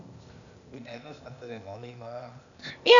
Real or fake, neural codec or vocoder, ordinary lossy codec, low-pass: fake; codec, 16 kHz, 0.8 kbps, ZipCodec; none; 7.2 kHz